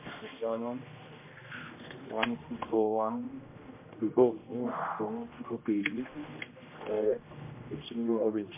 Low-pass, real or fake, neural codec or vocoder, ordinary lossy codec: 3.6 kHz; fake; codec, 16 kHz, 1 kbps, X-Codec, HuBERT features, trained on general audio; MP3, 32 kbps